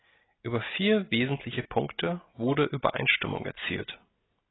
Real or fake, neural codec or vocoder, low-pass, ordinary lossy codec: real; none; 7.2 kHz; AAC, 16 kbps